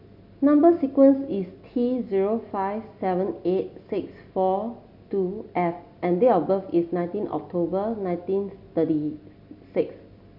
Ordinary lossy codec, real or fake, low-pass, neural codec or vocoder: none; real; 5.4 kHz; none